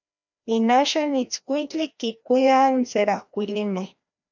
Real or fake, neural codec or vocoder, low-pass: fake; codec, 16 kHz, 1 kbps, FreqCodec, larger model; 7.2 kHz